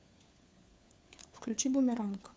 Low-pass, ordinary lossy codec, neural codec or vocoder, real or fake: none; none; codec, 16 kHz, 4 kbps, FunCodec, trained on LibriTTS, 50 frames a second; fake